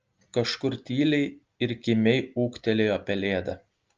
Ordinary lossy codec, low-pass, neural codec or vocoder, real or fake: Opus, 24 kbps; 7.2 kHz; none; real